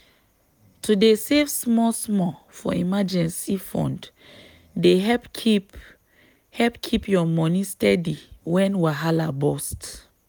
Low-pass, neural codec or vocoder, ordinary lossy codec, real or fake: none; none; none; real